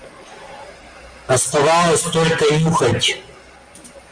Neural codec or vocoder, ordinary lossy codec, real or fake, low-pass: none; Opus, 32 kbps; real; 9.9 kHz